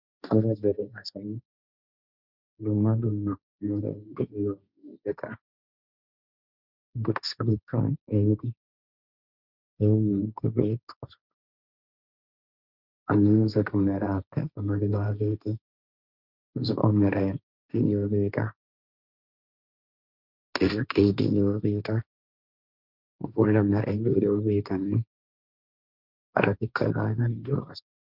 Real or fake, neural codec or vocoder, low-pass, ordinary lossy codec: fake; codec, 16 kHz, 1.1 kbps, Voila-Tokenizer; 5.4 kHz; Opus, 64 kbps